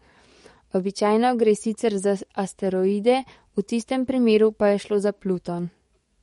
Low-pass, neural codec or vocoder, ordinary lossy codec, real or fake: 19.8 kHz; codec, 44.1 kHz, 7.8 kbps, DAC; MP3, 48 kbps; fake